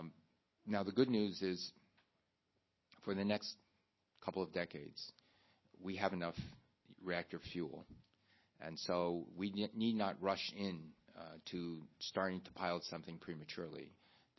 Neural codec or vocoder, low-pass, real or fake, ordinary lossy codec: none; 7.2 kHz; real; MP3, 24 kbps